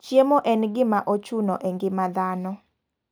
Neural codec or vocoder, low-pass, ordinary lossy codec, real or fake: none; none; none; real